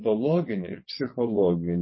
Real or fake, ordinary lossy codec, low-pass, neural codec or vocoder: fake; MP3, 24 kbps; 7.2 kHz; vocoder, 22.05 kHz, 80 mel bands, WaveNeXt